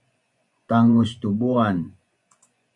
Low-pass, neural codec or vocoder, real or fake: 10.8 kHz; vocoder, 24 kHz, 100 mel bands, Vocos; fake